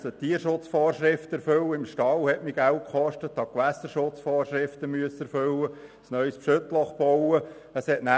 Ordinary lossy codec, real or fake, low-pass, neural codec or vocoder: none; real; none; none